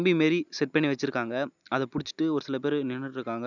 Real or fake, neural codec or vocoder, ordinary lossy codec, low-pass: real; none; none; 7.2 kHz